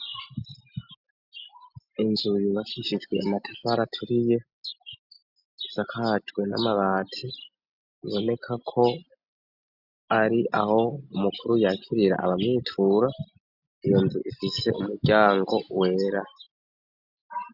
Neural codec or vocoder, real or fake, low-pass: none; real; 5.4 kHz